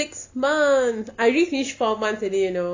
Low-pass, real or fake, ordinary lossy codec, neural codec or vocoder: 7.2 kHz; real; AAC, 48 kbps; none